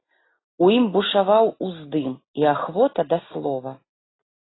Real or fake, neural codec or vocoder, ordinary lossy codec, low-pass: real; none; AAC, 16 kbps; 7.2 kHz